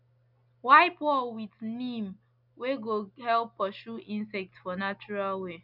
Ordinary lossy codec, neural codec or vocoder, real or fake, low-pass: none; none; real; 5.4 kHz